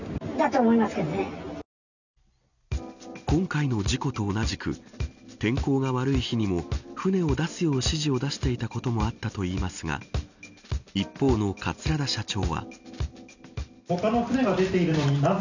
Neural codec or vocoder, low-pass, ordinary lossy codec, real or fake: none; 7.2 kHz; none; real